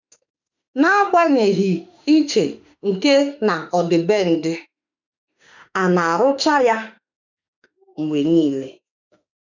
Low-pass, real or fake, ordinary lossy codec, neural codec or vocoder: 7.2 kHz; fake; none; autoencoder, 48 kHz, 32 numbers a frame, DAC-VAE, trained on Japanese speech